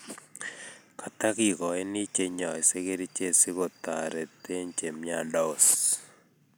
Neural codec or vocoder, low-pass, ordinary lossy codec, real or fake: none; none; none; real